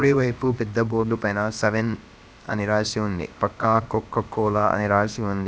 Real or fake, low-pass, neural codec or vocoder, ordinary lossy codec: fake; none; codec, 16 kHz, about 1 kbps, DyCAST, with the encoder's durations; none